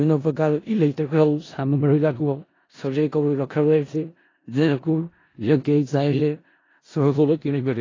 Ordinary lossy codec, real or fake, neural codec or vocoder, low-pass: AAC, 32 kbps; fake; codec, 16 kHz in and 24 kHz out, 0.4 kbps, LongCat-Audio-Codec, four codebook decoder; 7.2 kHz